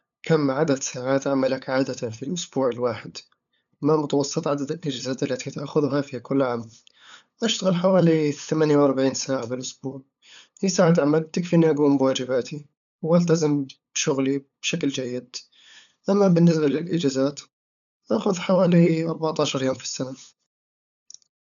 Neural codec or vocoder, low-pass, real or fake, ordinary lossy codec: codec, 16 kHz, 8 kbps, FunCodec, trained on LibriTTS, 25 frames a second; 7.2 kHz; fake; none